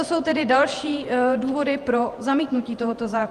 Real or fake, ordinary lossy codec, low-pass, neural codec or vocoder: fake; Opus, 32 kbps; 14.4 kHz; vocoder, 48 kHz, 128 mel bands, Vocos